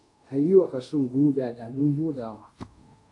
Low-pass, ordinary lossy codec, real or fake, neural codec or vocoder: 10.8 kHz; AAC, 64 kbps; fake; codec, 24 kHz, 0.5 kbps, DualCodec